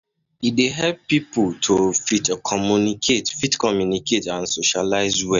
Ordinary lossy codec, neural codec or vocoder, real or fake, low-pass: none; none; real; 7.2 kHz